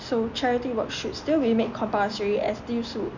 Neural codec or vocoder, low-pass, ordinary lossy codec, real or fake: none; 7.2 kHz; none; real